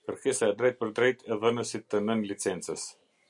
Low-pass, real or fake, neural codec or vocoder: 10.8 kHz; real; none